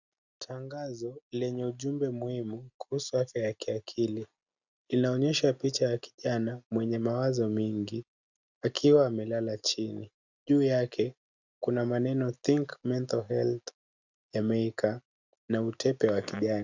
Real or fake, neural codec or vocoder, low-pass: real; none; 7.2 kHz